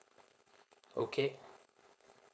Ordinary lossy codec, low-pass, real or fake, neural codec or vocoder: none; none; fake; codec, 16 kHz, 4.8 kbps, FACodec